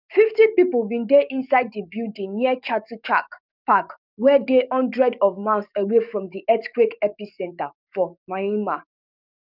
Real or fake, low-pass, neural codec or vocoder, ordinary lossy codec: fake; 5.4 kHz; codec, 44.1 kHz, 7.8 kbps, DAC; none